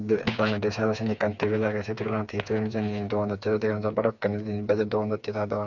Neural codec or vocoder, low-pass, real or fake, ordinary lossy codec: codec, 16 kHz, 4 kbps, FreqCodec, smaller model; 7.2 kHz; fake; none